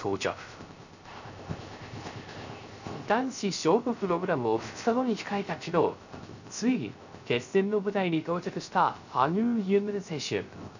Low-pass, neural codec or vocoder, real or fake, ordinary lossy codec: 7.2 kHz; codec, 16 kHz, 0.3 kbps, FocalCodec; fake; none